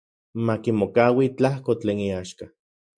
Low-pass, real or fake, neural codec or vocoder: 9.9 kHz; real; none